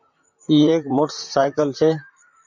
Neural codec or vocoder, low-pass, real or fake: vocoder, 44.1 kHz, 128 mel bands, Pupu-Vocoder; 7.2 kHz; fake